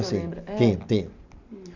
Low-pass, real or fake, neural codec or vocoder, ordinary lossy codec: 7.2 kHz; real; none; none